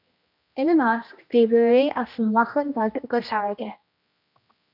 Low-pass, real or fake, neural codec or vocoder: 5.4 kHz; fake; codec, 16 kHz, 1 kbps, X-Codec, HuBERT features, trained on general audio